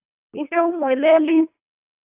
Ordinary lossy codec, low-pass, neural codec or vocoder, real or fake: AAC, 32 kbps; 3.6 kHz; codec, 24 kHz, 1.5 kbps, HILCodec; fake